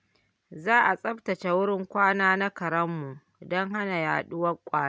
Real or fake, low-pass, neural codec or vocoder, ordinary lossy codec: real; none; none; none